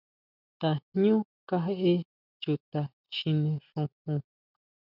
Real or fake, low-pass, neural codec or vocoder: real; 5.4 kHz; none